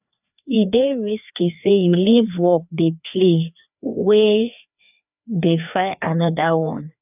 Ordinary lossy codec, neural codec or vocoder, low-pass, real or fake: none; codec, 16 kHz, 2 kbps, FreqCodec, larger model; 3.6 kHz; fake